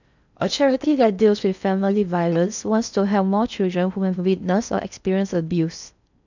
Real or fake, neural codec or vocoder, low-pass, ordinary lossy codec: fake; codec, 16 kHz in and 24 kHz out, 0.6 kbps, FocalCodec, streaming, 4096 codes; 7.2 kHz; none